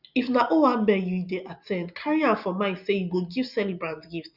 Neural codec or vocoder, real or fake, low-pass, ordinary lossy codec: none; real; 5.4 kHz; none